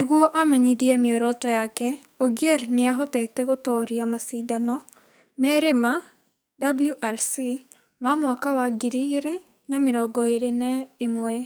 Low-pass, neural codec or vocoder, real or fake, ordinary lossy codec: none; codec, 44.1 kHz, 2.6 kbps, SNAC; fake; none